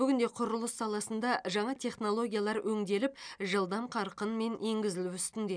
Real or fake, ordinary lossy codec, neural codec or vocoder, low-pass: real; none; none; 9.9 kHz